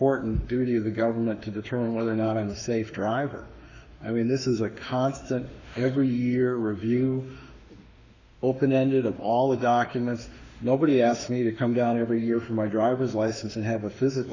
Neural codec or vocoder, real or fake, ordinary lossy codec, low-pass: autoencoder, 48 kHz, 32 numbers a frame, DAC-VAE, trained on Japanese speech; fake; Opus, 64 kbps; 7.2 kHz